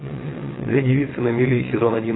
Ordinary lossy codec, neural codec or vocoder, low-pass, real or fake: AAC, 16 kbps; vocoder, 22.05 kHz, 80 mel bands, WaveNeXt; 7.2 kHz; fake